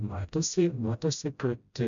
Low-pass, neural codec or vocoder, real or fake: 7.2 kHz; codec, 16 kHz, 0.5 kbps, FreqCodec, smaller model; fake